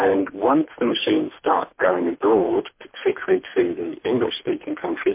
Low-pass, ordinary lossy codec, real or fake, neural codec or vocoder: 3.6 kHz; MP3, 24 kbps; fake; codec, 24 kHz, 3 kbps, HILCodec